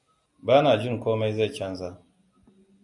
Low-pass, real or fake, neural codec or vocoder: 10.8 kHz; real; none